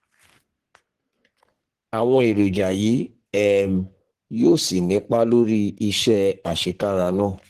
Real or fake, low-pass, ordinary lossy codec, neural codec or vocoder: fake; 14.4 kHz; Opus, 16 kbps; codec, 44.1 kHz, 3.4 kbps, Pupu-Codec